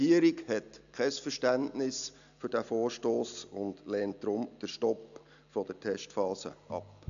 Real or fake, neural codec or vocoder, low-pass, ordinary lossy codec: real; none; 7.2 kHz; none